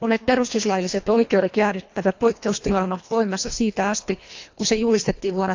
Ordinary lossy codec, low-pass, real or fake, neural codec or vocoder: AAC, 48 kbps; 7.2 kHz; fake; codec, 24 kHz, 1.5 kbps, HILCodec